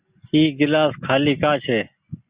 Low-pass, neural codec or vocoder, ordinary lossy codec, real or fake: 3.6 kHz; none; Opus, 64 kbps; real